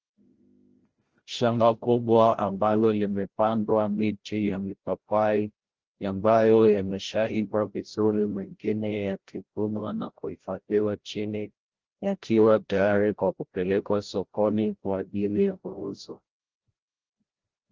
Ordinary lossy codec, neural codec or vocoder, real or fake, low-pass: Opus, 16 kbps; codec, 16 kHz, 0.5 kbps, FreqCodec, larger model; fake; 7.2 kHz